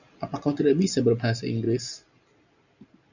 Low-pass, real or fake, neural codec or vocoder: 7.2 kHz; real; none